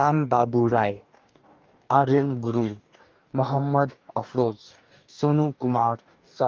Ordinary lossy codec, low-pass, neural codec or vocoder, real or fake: Opus, 32 kbps; 7.2 kHz; codec, 44.1 kHz, 2.6 kbps, DAC; fake